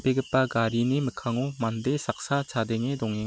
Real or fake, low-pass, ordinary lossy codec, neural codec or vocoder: real; none; none; none